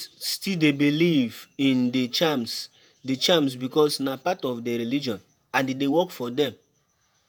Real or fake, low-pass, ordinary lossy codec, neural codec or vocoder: fake; none; none; vocoder, 48 kHz, 128 mel bands, Vocos